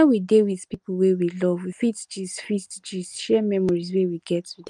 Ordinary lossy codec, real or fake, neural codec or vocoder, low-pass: Opus, 32 kbps; real; none; 10.8 kHz